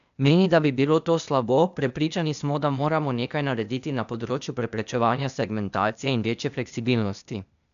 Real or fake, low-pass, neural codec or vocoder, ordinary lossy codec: fake; 7.2 kHz; codec, 16 kHz, 0.8 kbps, ZipCodec; none